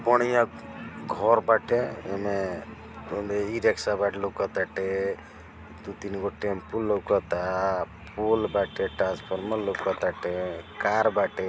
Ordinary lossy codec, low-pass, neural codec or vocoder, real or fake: none; none; none; real